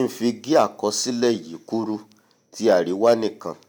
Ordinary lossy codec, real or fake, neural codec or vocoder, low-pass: none; real; none; none